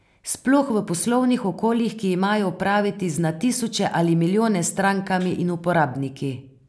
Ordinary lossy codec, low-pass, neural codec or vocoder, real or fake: none; none; none; real